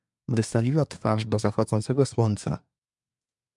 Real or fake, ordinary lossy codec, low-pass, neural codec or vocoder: fake; MP3, 96 kbps; 10.8 kHz; codec, 24 kHz, 1 kbps, SNAC